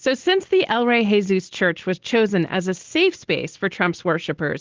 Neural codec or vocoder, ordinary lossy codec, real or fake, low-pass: none; Opus, 16 kbps; real; 7.2 kHz